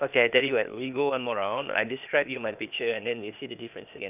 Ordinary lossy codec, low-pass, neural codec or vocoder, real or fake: none; 3.6 kHz; codec, 16 kHz, 0.8 kbps, ZipCodec; fake